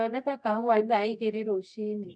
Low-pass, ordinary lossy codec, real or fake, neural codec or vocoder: 9.9 kHz; none; fake; codec, 24 kHz, 0.9 kbps, WavTokenizer, medium music audio release